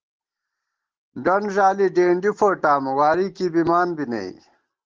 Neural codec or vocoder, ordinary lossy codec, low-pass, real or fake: none; Opus, 16 kbps; 7.2 kHz; real